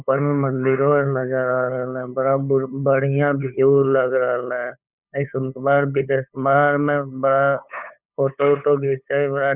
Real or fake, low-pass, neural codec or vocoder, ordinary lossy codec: fake; 3.6 kHz; codec, 16 kHz, 8 kbps, FunCodec, trained on LibriTTS, 25 frames a second; none